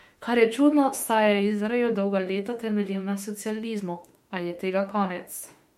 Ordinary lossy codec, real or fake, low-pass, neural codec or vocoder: MP3, 64 kbps; fake; 19.8 kHz; autoencoder, 48 kHz, 32 numbers a frame, DAC-VAE, trained on Japanese speech